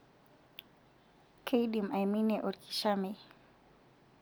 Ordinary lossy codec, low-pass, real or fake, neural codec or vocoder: none; none; real; none